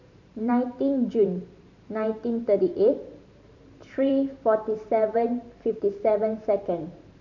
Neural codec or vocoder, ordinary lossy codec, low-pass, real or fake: vocoder, 44.1 kHz, 128 mel bands every 512 samples, BigVGAN v2; none; 7.2 kHz; fake